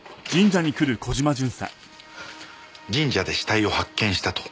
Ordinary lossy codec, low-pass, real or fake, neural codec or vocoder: none; none; real; none